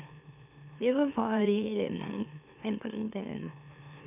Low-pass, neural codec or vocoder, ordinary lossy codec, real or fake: 3.6 kHz; autoencoder, 44.1 kHz, a latent of 192 numbers a frame, MeloTTS; none; fake